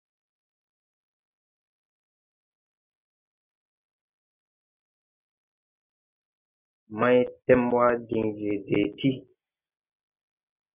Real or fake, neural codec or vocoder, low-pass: real; none; 3.6 kHz